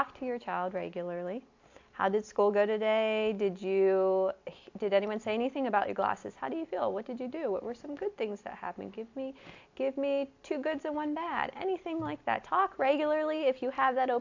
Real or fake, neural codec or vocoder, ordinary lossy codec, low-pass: real; none; AAC, 48 kbps; 7.2 kHz